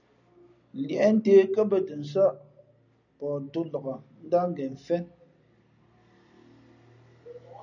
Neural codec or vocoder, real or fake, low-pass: none; real; 7.2 kHz